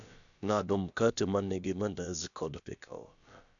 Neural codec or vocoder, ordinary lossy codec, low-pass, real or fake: codec, 16 kHz, about 1 kbps, DyCAST, with the encoder's durations; none; 7.2 kHz; fake